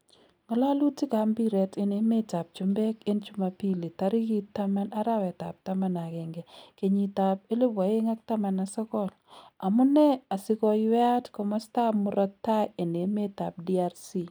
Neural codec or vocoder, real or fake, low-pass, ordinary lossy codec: none; real; none; none